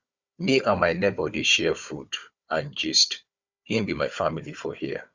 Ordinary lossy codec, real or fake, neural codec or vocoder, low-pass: Opus, 64 kbps; fake; codec, 16 kHz, 4 kbps, FunCodec, trained on Chinese and English, 50 frames a second; 7.2 kHz